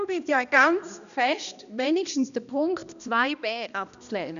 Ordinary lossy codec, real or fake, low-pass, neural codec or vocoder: none; fake; 7.2 kHz; codec, 16 kHz, 1 kbps, X-Codec, HuBERT features, trained on balanced general audio